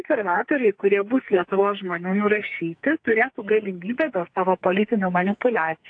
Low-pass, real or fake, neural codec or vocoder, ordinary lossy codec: 9.9 kHz; fake; codec, 44.1 kHz, 2.6 kbps, SNAC; AAC, 48 kbps